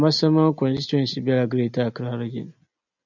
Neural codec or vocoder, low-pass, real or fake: none; 7.2 kHz; real